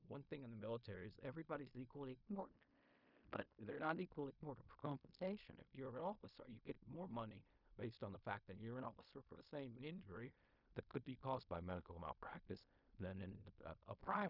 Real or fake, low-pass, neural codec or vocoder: fake; 5.4 kHz; codec, 16 kHz in and 24 kHz out, 0.4 kbps, LongCat-Audio-Codec, fine tuned four codebook decoder